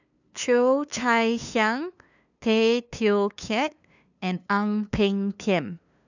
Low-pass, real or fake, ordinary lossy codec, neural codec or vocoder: 7.2 kHz; fake; none; codec, 16 kHz, 2 kbps, FunCodec, trained on LibriTTS, 25 frames a second